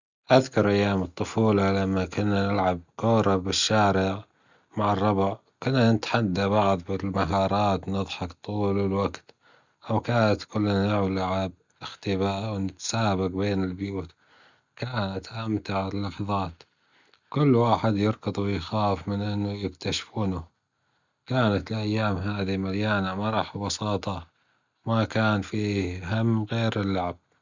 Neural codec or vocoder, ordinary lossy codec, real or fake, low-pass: none; Opus, 64 kbps; real; 7.2 kHz